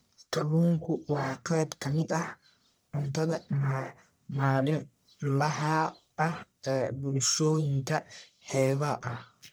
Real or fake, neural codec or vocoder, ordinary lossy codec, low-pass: fake; codec, 44.1 kHz, 1.7 kbps, Pupu-Codec; none; none